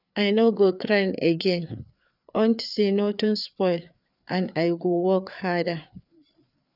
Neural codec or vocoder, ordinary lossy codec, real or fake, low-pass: codec, 16 kHz, 4 kbps, FreqCodec, larger model; none; fake; 5.4 kHz